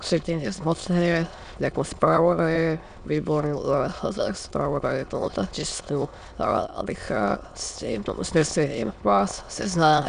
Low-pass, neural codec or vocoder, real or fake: 9.9 kHz; autoencoder, 22.05 kHz, a latent of 192 numbers a frame, VITS, trained on many speakers; fake